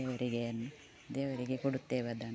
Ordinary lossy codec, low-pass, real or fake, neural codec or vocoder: none; none; real; none